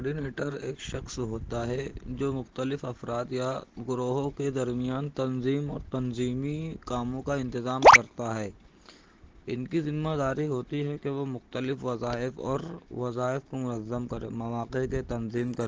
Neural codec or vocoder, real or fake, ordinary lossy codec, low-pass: none; real; Opus, 16 kbps; 7.2 kHz